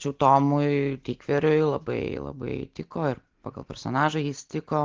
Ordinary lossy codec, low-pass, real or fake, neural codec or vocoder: Opus, 16 kbps; 7.2 kHz; real; none